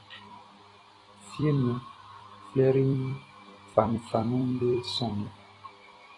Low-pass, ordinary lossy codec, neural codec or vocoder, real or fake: 10.8 kHz; AAC, 32 kbps; vocoder, 44.1 kHz, 128 mel bands every 256 samples, BigVGAN v2; fake